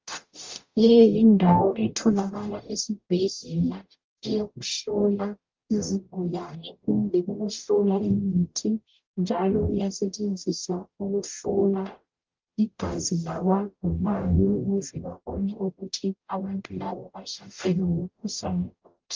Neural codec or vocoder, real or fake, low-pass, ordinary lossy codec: codec, 44.1 kHz, 0.9 kbps, DAC; fake; 7.2 kHz; Opus, 32 kbps